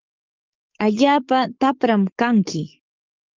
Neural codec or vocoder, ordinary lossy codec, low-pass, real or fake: codec, 44.1 kHz, 7.8 kbps, DAC; Opus, 24 kbps; 7.2 kHz; fake